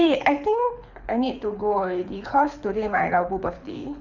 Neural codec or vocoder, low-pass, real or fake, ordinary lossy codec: codec, 24 kHz, 6 kbps, HILCodec; 7.2 kHz; fake; none